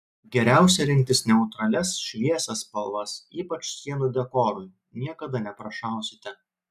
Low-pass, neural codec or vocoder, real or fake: 14.4 kHz; none; real